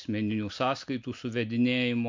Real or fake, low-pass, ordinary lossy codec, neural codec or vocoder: real; 7.2 kHz; MP3, 64 kbps; none